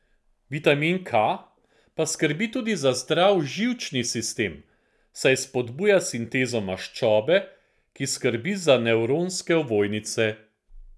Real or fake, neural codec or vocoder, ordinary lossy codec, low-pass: real; none; none; none